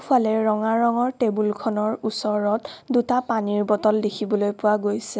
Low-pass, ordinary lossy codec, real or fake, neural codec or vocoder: none; none; real; none